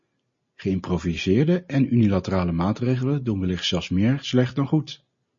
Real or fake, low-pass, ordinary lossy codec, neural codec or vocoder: real; 7.2 kHz; MP3, 32 kbps; none